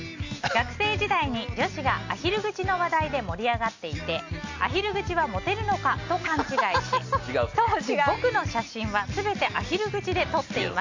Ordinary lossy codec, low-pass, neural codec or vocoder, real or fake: AAC, 48 kbps; 7.2 kHz; none; real